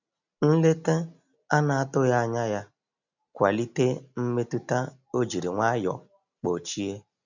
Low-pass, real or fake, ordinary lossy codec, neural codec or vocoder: 7.2 kHz; real; none; none